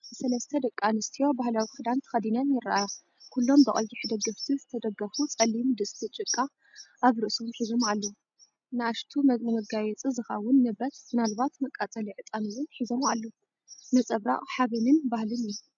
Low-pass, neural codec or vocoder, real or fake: 7.2 kHz; none; real